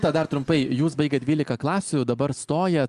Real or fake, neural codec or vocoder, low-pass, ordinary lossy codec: real; none; 10.8 kHz; Opus, 32 kbps